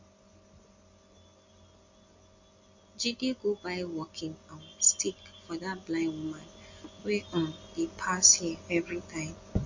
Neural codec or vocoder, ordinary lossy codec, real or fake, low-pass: none; MP3, 48 kbps; real; 7.2 kHz